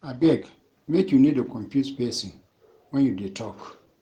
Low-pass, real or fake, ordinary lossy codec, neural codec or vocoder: 19.8 kHz; real; Opus, 16 kbps; none